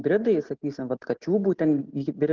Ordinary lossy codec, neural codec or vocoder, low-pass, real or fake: Opus, 16 kbps; none; 7.2 kHz; real